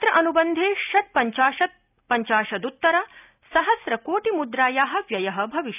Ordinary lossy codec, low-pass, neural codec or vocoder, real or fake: none; 3.6 kHz; none; real